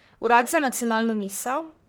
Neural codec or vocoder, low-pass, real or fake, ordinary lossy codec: codec, 44.1 kHz, 1.7 kbps, Pupu-Codec; none; fake; none